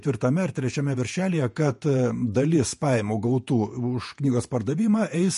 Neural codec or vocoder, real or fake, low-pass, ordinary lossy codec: vocoder, 48 kHz, 128 mel bands, Vocos; fake; 14.4 kHz; MP3, 48 kbps